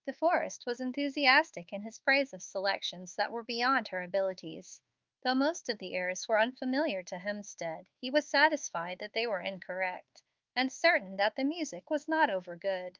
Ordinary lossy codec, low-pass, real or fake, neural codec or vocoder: Opus, 32 kbps; 7.2 kHz; fake; codec, 24 kHz, 1.2 kbps, DualCodec